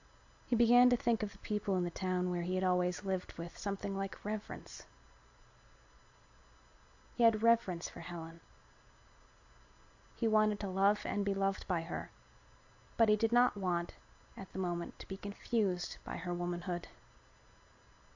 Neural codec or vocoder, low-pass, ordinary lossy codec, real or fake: none; 7.2 kHz; AAC, 48 kbps; real